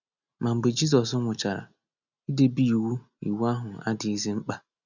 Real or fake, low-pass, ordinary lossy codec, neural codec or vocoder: real; 7.2 kHz; none; none